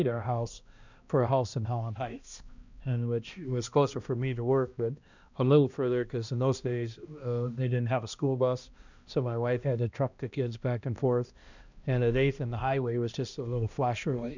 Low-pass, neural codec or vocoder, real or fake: 7.2 kHz; codec, 16 kHz, 1 kbps, X-Codec, HuBERT features, trained on balanced general audio; fake